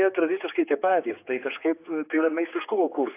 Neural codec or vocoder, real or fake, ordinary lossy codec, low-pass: codec, 16 kHz, 4 kbps, X-Codec, HuBERT features, trained on general audio; fake; AAC, 24 kbps; 3.6 kHz